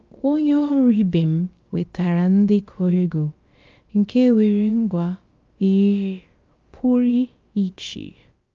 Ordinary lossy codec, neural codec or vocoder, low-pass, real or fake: Opus, 24 kbps; codec, 16 kHz, about 1 kbps, DyCAST, with the encoder's durations; 7.2 kHz; fake